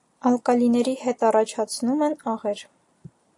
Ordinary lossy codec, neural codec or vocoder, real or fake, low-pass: AAC, 48 kbps; none; real; 10.8 kHz